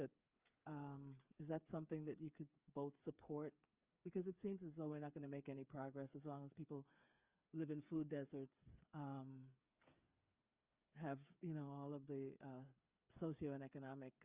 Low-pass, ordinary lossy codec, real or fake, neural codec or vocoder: 3.6 kHz; Opus, 24 kbps; fake; codec, 16 kHz, 8 kbps, FreqCodec, smaller model